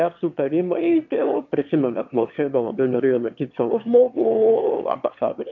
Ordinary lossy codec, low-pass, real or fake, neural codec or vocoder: MP3, 48 kbps; 7.2 kHz; fake; autoencoder, 22.05 kHz, a latent of 192 numbers a frame, VITS, trained on one speaker